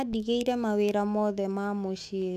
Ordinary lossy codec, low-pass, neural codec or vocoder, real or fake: none; 14.4 kHz; autoencoder, 48 kHz, 128 numbers a frame, DAC-VAE, trained on Japanese speech; fake